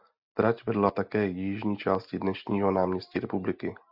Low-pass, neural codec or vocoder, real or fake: 5.4 kHz; none; real